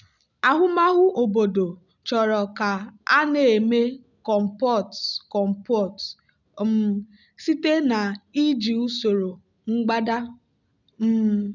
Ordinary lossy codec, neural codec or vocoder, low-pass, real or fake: none; none; 7.2 kHz; real